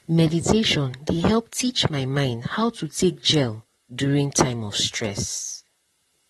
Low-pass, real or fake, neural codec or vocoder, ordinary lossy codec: 19.8 kHz; fake; vocoder, 44.1 kHz, 128 mel bands, Pupu-Vocoder; AAC, 32 kbps